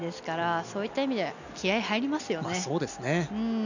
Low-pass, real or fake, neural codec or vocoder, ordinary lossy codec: 7.2 kHz; real; none; none